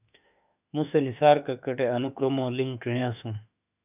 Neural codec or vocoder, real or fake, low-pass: autoencoder, 48 kHz, 32 numbers a frame, DAC-VAE, trained on Japanese speech; fake; 3.6 kHz